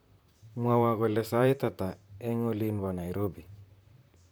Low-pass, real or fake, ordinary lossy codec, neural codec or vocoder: none; fake; none; vocoder, 44.1 kHz, 128 mel bands, Pupu-Vocoder